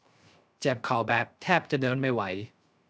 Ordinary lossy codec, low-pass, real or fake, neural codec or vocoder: none; none; fake; codec, 16 kHz, 0.3 kbps, FocalCodec